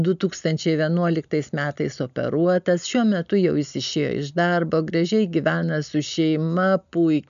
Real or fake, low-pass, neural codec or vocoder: real; 7.2 kHz; none